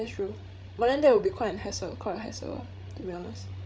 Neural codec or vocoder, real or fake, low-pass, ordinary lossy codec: codec, 16 kHz, 16 kbps, FreqCodec, larger model; fake; none; none